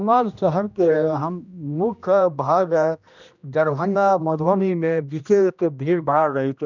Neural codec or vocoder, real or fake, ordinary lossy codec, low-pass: codec, 16 kHz, 1 kbps, X-Codec, HuBERT features, trained on general audio; fake; none; 7.2 kHz